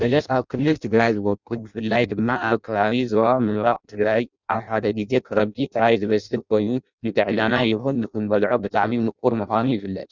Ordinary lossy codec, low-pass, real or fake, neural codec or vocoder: Opus, 64 kbps; 7.2 kHz; fake; codec, 16 kHz in and 24 kHz out, 0.6 kbps, FireRedTTS-2 codec